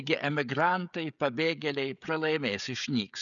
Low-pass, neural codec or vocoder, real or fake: 7.2 kHz; codec, 16 kHz, 16 kbps, FreqCodec, smaller model; fake